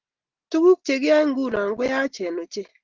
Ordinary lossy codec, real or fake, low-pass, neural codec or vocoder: Opus, 16 kbps; real; 7.2 kHz; none